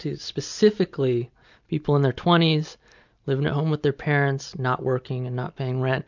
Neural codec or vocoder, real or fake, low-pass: none; real; 7.2 kHz